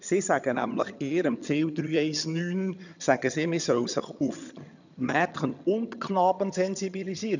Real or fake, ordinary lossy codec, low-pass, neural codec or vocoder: fake; none; 7.2 kHz; vocoder, 22.05 kHz, 80 mel bands, HiFi-GAN